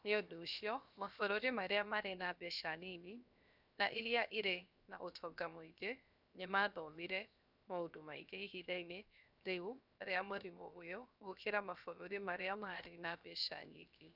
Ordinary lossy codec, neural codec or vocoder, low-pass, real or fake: none; codec, 16 kHz, 0.7 kbps, FocalCodec; 5.4 kHz; fake